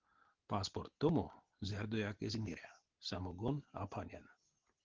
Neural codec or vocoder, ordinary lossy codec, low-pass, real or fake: none; Opus, 16 kbps; 7.2 kHz; real